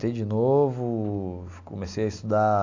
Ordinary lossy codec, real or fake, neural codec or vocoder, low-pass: AAC, 48 kbps; real; none; 7.2 kHz